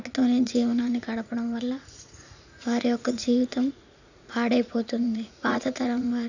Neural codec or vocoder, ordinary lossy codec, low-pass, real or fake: vocoder, 44.1 kHz, 80 mel bands, Vocos; none; 7.2 kHz; fake